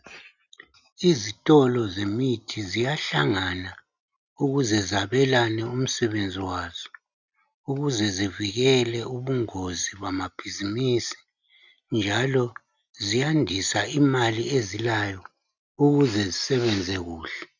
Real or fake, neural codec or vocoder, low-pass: real; none; 7.2 kHz